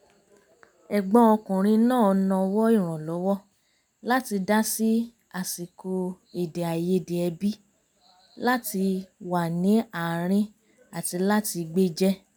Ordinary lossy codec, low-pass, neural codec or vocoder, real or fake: none; none; none; real